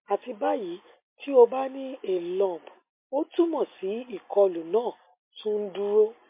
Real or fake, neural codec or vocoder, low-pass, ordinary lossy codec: real; none; 3.6 kHz; MP3, 24 kbps